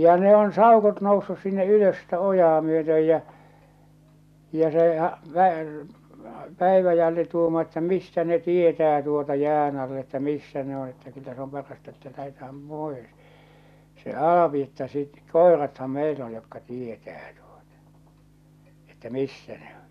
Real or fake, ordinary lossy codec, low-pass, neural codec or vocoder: real; none; 14.4 kHz; none